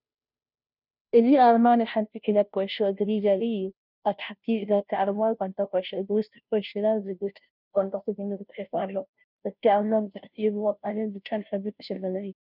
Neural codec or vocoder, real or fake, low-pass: codec, 16 kHz, 0.5 kbps, FunCodec, trained on Chinese and English, 25 frames a second; fake; 5.4 kHz